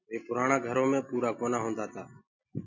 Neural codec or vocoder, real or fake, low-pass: none; real; 7.2 kHz